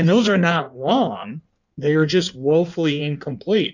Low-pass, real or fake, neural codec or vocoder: 7.2 kHz; fake; codec, 16 kHz in and 24 kHz out, 1.1 kbps, FireRedTTS-2 codec